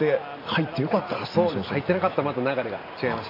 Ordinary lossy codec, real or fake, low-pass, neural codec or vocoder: MP3, 48 kbps; real; 5.4 kHz; none